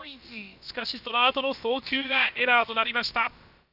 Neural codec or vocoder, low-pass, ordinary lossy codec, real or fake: codec, 16 kHz, about 1 kbps, DyCAST, with the encoder's durations; 5.4 kHz; none; fake